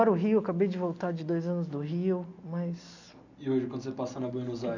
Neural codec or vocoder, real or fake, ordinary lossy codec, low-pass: none; real; none; 7.2 kHz